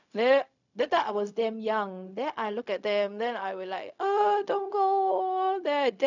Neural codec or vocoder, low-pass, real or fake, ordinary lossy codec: codec, 16 kHz, 0.4 kbps, LongCat-Audio-Codec; 7.2 kHz; fake; none